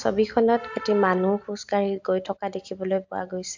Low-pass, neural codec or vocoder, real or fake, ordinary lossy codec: 7.2 kHz; none; real; MP3, 64 kbps